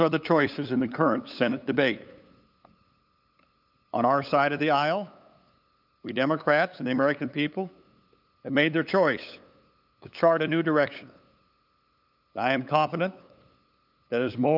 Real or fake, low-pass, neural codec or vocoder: fake; 5.4 kHz; codec, 16 kHz, 8 kbps, FunCodec, trained on LibriTTS, 25 frames a second